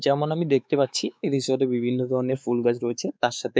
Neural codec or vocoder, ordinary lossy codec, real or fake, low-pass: codec, 16 kHz, 4 kbps, X-Codec, WavLM features, trained on Multilingual LibriSpeech; none; fake; none